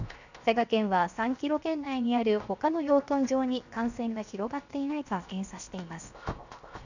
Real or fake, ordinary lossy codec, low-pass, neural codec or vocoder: fake; none; 7.2 kHz; codec, 16 kHz, 0.7 kbps, FocalCodec